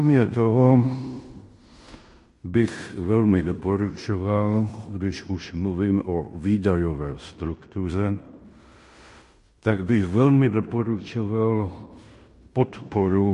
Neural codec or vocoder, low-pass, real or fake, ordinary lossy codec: codec, 16 kHz in and 24 kHz out, 0.9 kbps, LongCat-Audio-Codec, fine tuned four codebook decoder; 10.8 kHz; fake; MP3, 48 kbps